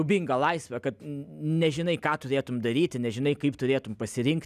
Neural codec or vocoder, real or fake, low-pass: none; real; 14.4 kHz